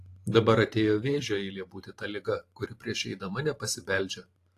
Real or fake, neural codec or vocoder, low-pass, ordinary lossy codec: fake; vocoder, 44.1 kHz, 128 mel bands every 512 samples, BigVGAN v2; 14.4 kHz; AAC, 48 kbps